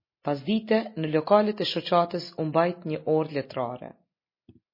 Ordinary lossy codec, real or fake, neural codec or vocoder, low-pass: MP3, 24 kbps; real; none; 5.4 kHz